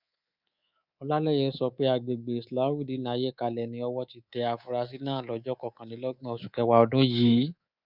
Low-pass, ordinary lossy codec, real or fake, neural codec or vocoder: 5.4 kHz; none; fake; codec, 24 kHz, 3.1 kbps, DualCodec